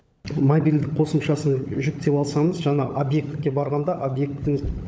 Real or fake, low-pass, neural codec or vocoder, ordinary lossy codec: fake; none; codec, 16 kHz, 16 kbps, FunCodec, trained on LibriTTS, 50 frames a second; none